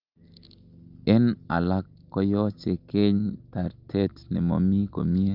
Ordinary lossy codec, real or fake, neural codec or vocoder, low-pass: Opus, 24 kbps; real; none; 5.4 kHz